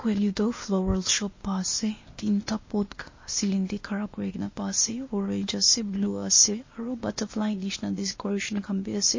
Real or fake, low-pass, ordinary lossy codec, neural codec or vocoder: fake; 7.2 kHz; MP3, 32 kbps; codec, 16 kHz, 0.8 kbps, ZipCodec